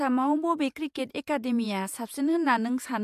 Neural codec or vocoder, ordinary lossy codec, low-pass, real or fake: vocoder, 48 kHz, 128 mel bands, Vocos; AAC, 96 kbps; 14.4 kHz; fake